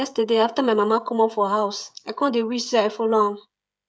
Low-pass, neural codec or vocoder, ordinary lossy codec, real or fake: none; codec, 16 kHz, 16 kbps, FreqCodec, smaller model; none; fake